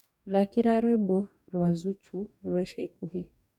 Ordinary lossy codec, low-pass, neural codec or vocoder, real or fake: none; 19.8 kHz; codec, 44.1 kHz, 2.6 kbps, DAC; fake